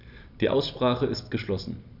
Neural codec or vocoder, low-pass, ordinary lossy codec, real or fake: none; 5.4 kHz; none; real